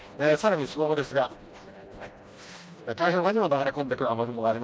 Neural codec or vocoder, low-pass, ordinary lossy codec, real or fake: codec, 16 kHz, 1 kbps, FreqCodec, smaller model; none; none; fake